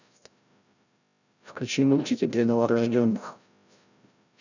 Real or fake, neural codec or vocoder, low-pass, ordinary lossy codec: fake; codec, 16 kHz, 0.5 kbps, FreqCodec, larger model; 7.2 kHz; none